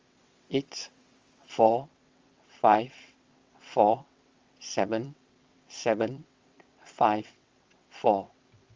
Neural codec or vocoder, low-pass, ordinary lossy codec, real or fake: vocoder, 22.05 kHz, 80 mel bands, WaveNeXt; 7.2 kHz; Opus, 32 kbps; fake